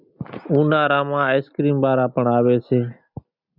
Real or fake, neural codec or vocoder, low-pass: real; none; 5.4 kHz